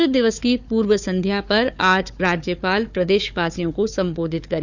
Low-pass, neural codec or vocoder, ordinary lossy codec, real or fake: 7.2 kHz; codec, 16 kHz, 4 kbps, FunCodec, trained on Chinese and English, 50 frames a second; none; fake